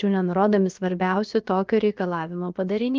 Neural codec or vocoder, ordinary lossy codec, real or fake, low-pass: codec, 16 kHz, about 1 kbps, DyCAST, with the encoder's durations; Opus, 32 kbps; fake; 7.2 kHz